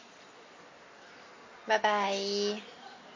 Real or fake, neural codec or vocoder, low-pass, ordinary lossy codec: real; none; 7.2 kHz; MP3, 32 kbps